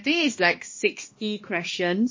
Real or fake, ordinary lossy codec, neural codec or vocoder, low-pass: fake; MP3, 32 kbps; codec, 16 kHz, 1 kbps, X-Codec, HuBERT features, trained on balanced general audio; 7.2 kHz